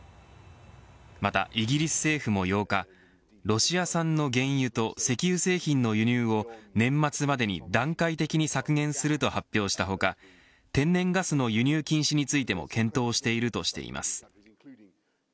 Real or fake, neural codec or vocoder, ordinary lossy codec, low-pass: real; none; none; none